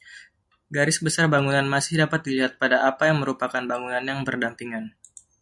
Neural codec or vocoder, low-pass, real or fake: none; 10.8 kHz; real